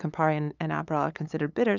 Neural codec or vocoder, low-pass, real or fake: codec, 16 kHz, 8 kbps, FunCodec, trained on LibriTTS, 25 frames a second; 7.2 kHz; fake